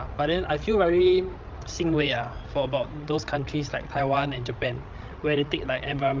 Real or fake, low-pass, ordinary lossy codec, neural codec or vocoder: fake; 7.2 kHz; Opus, 24 kbps; codec, 16 kHz, 16 kbps, FreqCodec, larger model